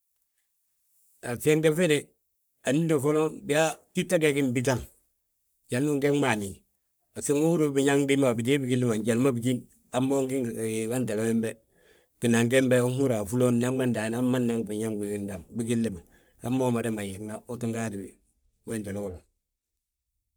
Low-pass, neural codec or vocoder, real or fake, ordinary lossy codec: none; codec, 44.1 kHz, 3.4 kbps, Pupu-Codec; fake; none